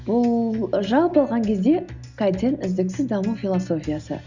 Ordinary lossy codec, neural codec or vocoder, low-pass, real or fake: Opus, 64 kbps; none; 7.2 kHz; real